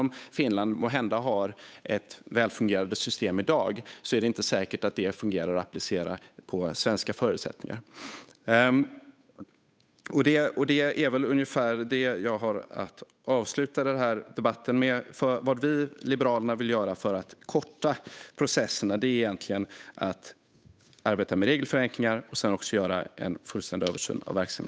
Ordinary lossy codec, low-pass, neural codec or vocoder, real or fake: none; none; codec, 16 kHz, 8 kbps, FunCodec, trained on Chinese and English, 25 frames a second; fake